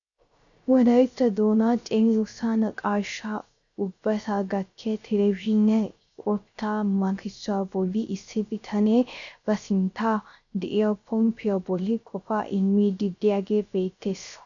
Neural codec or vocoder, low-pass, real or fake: codec, 16 kHz, 0.3 kbps, FocalCodec; 7.2 kHz; fake